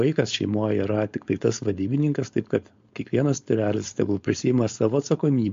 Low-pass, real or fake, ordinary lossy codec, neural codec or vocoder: 7.2 kHz; fake; MP3, 48 kbps; codec, 16 kHz, 4.8 kbps, FACodec